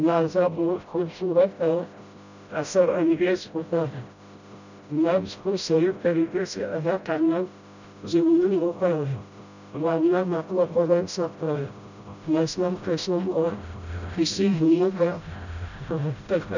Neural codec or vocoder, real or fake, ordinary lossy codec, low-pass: codec, 16 kHz, 0.5 kbps, FreqCodec, smaller model; fake; none; 7.2 kHz